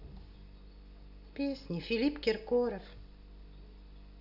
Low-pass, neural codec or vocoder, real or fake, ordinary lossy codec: 5.4 kHz; none; real; none